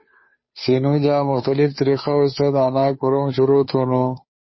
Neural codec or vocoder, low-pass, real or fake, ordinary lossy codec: codec, 16 kHz, 2 kbps, FunCodec, trained on Chinese and English, 25 frames a second; 7.2 kHz; fake; MP3, 24 kbps